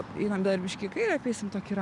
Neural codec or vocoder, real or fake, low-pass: none; real; 10.8 kHz